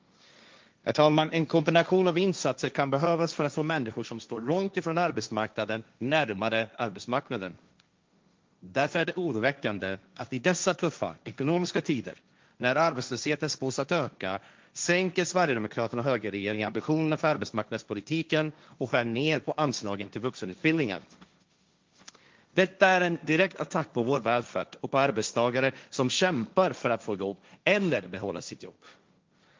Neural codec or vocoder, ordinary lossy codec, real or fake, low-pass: codec, 16 kHz, 1.1 kbps, Voila-Tokenizer; Opus, 24 kbps; fake; 7.2 kHz